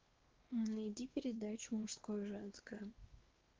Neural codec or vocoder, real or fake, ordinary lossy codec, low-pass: codec, 16 kHz, 2 kbps, X-Codec, WavLM features, trained on Multilingual LibriSpeech; fake; Opus, 16 kbps; 7.2 kHz